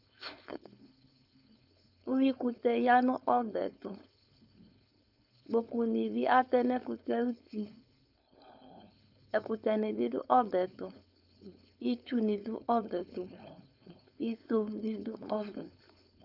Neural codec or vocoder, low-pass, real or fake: codec, 16 kHz, 4.8 kbps, FACodec; 5.4 kHz; fake